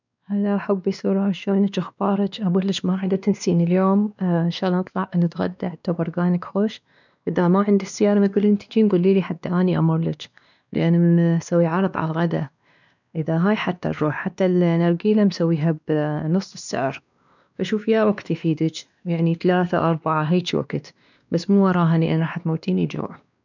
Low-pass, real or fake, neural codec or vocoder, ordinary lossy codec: 7.2 kHz; fake; codec, 16 kHz, 2 kbps, X-Codec, WavLM features, trained on Multilingual LibriSpeech; none